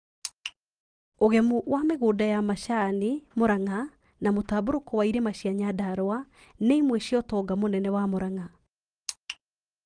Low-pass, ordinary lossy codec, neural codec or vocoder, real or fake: 9.9 kHz; Opus, 24 kbps; none; real